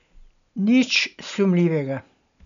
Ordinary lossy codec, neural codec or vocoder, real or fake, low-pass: none; none; real; 7.2 kHz